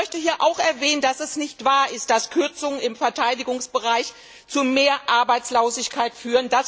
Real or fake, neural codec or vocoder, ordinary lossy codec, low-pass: real; none; none; none